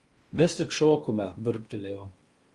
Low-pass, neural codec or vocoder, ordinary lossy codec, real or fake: 10.8 kHz; codec, 16 kHz in and 24 kHz out, 0.8 kbps, FocalCodec, streaming, 65536 codes; Opus, 32 kbps; fake